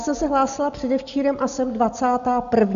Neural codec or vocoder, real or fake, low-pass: none; real; 7.2 kHz